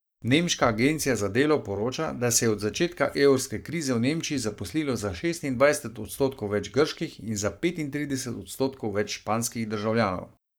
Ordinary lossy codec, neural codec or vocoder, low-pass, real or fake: none; codec, 44.1 kHz, 7.8 kbps, Pupu-Codec; none; fake